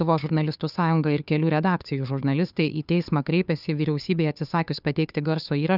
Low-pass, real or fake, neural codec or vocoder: 5.4 kHz; fake; codec, 16 kHz, 2 kbps, FunCodec, trained on Chinese and English, 25 frames a second